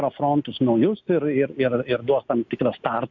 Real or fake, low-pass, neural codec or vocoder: real; 7.2 kHz; none